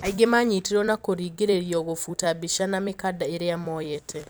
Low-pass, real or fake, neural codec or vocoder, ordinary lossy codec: none; fake; vocoder, 44.1 kHz, 128 mel bands every 256 samples, BigVGAN v2; none